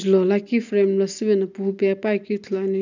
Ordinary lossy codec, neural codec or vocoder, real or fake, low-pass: none; none; real; 7.2 kHz